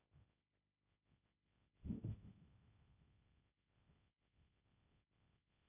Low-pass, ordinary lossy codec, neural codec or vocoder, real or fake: 3.6 kHz; none; codec, 16 kHz, 1.1 kbps, Voila-Tokenizer; fake